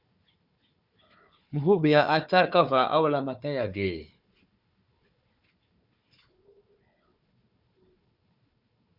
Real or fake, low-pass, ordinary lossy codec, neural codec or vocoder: fake; 5.4 kHz; Opus, 64 kbps; codec, 16 kHz, 4 kbps, FunCodec, trained on Chinese and English, 50 frames a second